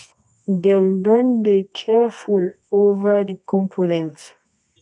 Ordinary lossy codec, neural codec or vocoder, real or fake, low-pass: none; codec, 24 kHz, 0.9 kbps, WavTokenizer, medium music audio release; fake; 10.8 kHz